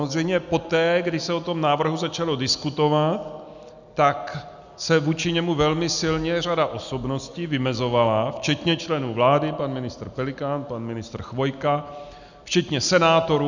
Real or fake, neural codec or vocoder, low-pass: real; none; 7.2 kHz